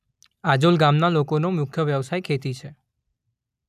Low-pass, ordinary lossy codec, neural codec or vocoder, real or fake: 14.4 kHz; none; none; real